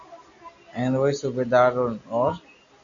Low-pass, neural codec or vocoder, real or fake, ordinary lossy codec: 7.2 kHz; none; real; AAC, 64 kbps